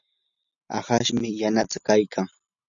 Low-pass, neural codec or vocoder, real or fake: 7.2 kHz; none; real